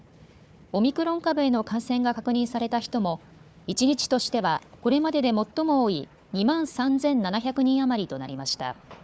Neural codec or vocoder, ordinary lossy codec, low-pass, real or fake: codec, 16 kHz, 4 kbps, FunCodec, trained on Chinese and English, 50 frames a second; none; none; fake